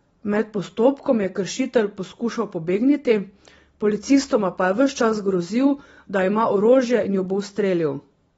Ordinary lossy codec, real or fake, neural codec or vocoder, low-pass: AAC, 24 kbps; real; none; 19.8 kHz